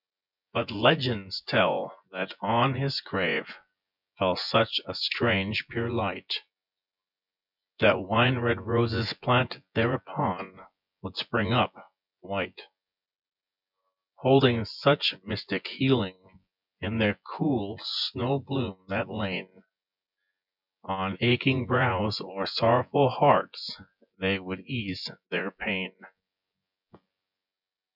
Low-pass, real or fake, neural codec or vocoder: 5.4 kHz; fake; vocoder, 24 kHz, 100 mel bands, Vocos